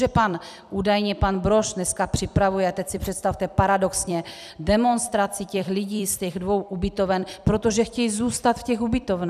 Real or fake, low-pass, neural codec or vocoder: fake; 14.4 kHz; vocoder, 44.1 kHz, 128 mel bands every 256 samples, BigVGAN v2